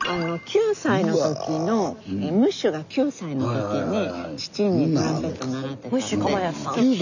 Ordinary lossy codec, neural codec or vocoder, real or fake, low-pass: none; none; real; 7.2 kHz